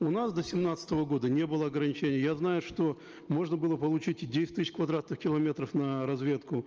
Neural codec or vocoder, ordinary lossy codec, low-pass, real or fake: none; Opus, 24 kbps; 7.2 kHz; real